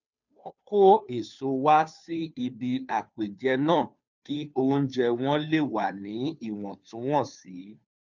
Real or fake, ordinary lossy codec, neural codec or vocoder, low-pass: fake; none; codec, 16 kHz, 2 kbps, FunCodec, trained on Chinese and English, 25 frames a second; 7.2 kHz